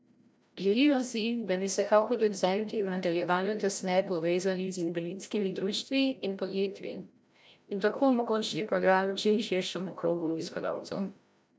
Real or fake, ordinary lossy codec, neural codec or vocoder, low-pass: fake; none; codec, 16 kHz, 0.5 kbps, FreqCodec, larger model; none